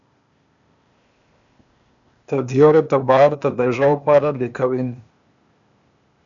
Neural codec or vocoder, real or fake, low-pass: codec, 16 kHz, 0.8 kbps, ZipCodec; fake; 7.2 kHz